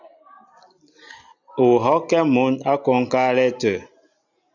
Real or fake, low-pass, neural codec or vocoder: real; 7.2 kHz; none